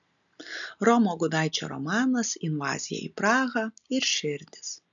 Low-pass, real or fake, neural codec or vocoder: 7.2 kHz; real; none